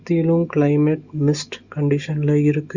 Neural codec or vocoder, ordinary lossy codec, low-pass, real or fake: none; Opus, 64 kbps; 7.2 kHz; real